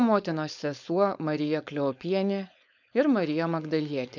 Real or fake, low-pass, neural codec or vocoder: fake; 7.2 kHz; codec, 16 kHz, 4.8 kbps, FACodec